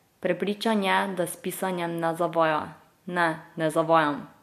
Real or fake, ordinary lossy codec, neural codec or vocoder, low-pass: real; MP3, 64 kbps; none; 14.4 kHz